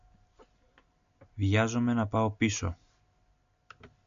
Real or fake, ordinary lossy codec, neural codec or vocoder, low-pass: real; MP3, 64 kbps; none; 7.2 kHz